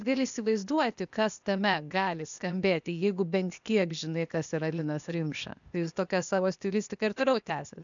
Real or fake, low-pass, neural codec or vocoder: fake; 7.2 kHz; codec, 16 kHz, 0.8 kbps, ZipCodec